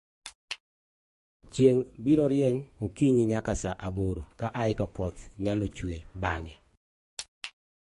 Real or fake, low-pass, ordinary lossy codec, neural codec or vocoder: fake; 14.4 kHz; MP3, 48 kbps; codec, 32 kHz, 1.9 kbps, SNAC